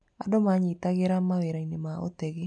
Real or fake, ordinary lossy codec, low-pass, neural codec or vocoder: real; none; 9.9 kHz; none